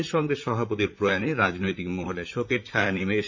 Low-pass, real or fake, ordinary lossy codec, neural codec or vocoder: 7.2 kHz; fake; MP3, 64 kbps; vocoder, 44.1 kHz, 128 mel bands, Pupu-Vocoder